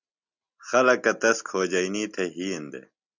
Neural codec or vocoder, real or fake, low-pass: none; real; 7.2 kHz